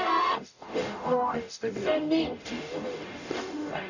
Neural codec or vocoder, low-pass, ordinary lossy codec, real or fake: codec, 44.1 kHz, 0.9 kbps, DAC; 7.2 kHz; none; fake